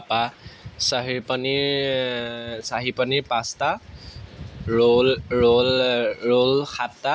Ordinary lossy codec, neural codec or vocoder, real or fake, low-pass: none; none; real; none